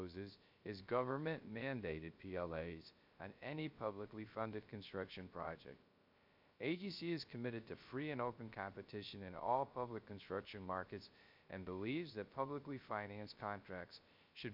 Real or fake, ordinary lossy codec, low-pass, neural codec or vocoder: fake; MP3, 48 kbps; 5.4 kHz; codec, 16 kHz, 0.3 kbps, FocalCodec